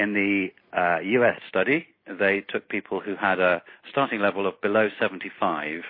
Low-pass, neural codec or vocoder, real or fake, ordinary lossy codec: 5.4 kHz; none; real; MP3, 24 kbps